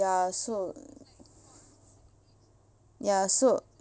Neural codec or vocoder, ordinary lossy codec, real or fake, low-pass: none; none; real; none